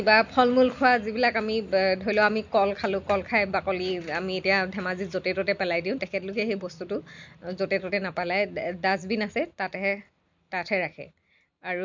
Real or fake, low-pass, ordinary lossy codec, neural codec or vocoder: real; 7.2 kHz; MP3, 64 kbps; none